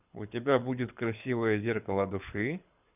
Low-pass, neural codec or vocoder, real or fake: 3.6 kHz; codec, 24 kHz, 6 kbps, HILCodec; fake